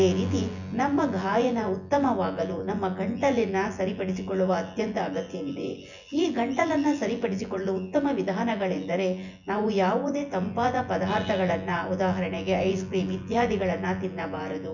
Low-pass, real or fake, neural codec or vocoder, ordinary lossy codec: 7.2 kHz; fake; vocoder, 24 kHz, 100 mel bands, Vocos; none